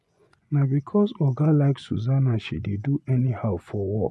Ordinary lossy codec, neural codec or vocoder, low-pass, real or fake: none; vocoder, 24 kHz, 100 mel bands, Vocos; none; fake